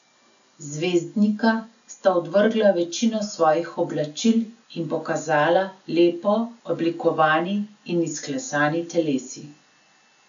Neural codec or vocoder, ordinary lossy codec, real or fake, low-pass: none; none; real; 7.2 kHz